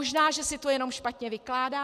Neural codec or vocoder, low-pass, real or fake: none; 14.4 kHz; real